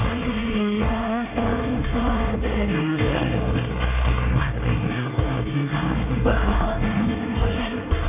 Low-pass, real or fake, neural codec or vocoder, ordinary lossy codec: 3.6 kHz; fake; codec, 24 kHz, 1 kbps, SNAC; none